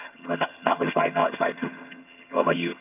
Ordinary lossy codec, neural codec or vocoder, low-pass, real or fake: none; vocoder, 22.05 kHz, 80 mel bands, HiFi-GAN; 3.6 kHz; fake